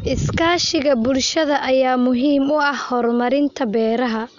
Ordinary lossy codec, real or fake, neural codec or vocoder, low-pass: none; real; none; 7.2 kHz